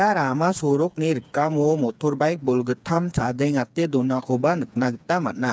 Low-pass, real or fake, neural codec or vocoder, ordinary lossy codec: none; fake; codec, 16 kHz, 4 kbps, FreqCodec, smaller model; none